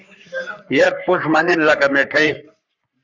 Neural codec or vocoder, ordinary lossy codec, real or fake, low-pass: codec, 44.1 kHz, 3.4 kbps, Pupu-Codec; Opus, 64 kbps; fake; 7.2 kHz